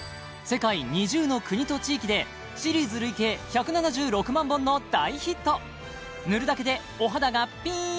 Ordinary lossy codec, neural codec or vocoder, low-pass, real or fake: none; none; none; real